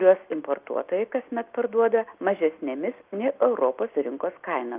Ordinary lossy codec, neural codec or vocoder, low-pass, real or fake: Opus, 24 kbps; none; 3.6 kHz; real